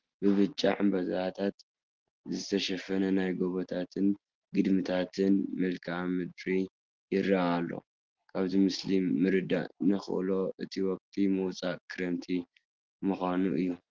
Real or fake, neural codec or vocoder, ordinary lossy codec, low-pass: real; none; Opus, 16 kbps; 7.2 kHz